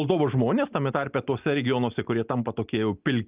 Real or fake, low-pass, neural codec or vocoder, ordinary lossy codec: real; 3.6 kHz; none; Opus, 32 kbps